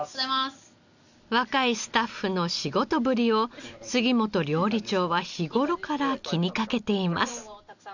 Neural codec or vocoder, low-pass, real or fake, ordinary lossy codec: none; 7.2 kHz; real; none